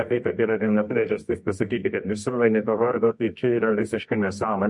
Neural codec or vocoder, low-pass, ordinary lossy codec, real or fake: codec, 24 kHz, 0.9 kbps, WavTokenizer, medium music audio release; 10.8 kHz; MP3, 48 kbps; fake